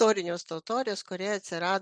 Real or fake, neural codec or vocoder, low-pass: real; none; 9.9 kHz